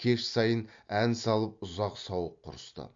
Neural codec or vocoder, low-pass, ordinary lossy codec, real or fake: none; 7.2 kHz; MP3, 48 kbps; real